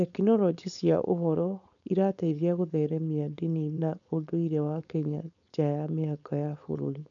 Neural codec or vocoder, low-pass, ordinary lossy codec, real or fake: codec, 16 kHz, 4.8 kbps, FACodec; 7.2 kHz; none; fake